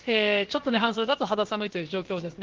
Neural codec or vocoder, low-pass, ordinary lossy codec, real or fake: codec, 16 kHz, about 1 kbps, DyCAST, with the encoder's durations; 7.2 kHz; Opus, 16 kbps; fake